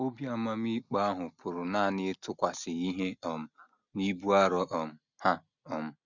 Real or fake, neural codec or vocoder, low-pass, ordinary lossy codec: real; none; 7.2 kHz; none